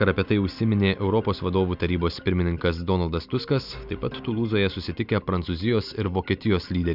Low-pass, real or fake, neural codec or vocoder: 5.4 kHz; real; none